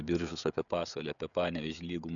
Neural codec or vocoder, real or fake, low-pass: codec, 16 kHz, 16 kbps, FreqCodec, smaller model; fake; 7.2 kHz